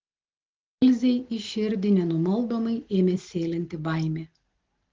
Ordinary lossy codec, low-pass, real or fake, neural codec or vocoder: Opus, 16 kbps; 7.2 kHz; real; none